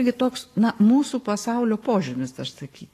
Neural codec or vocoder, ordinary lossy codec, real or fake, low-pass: codec, 44.1 kHz, 7.8 kbps, Pupu-Codec; AAC, 48 kbps; fake; 14.4 kHz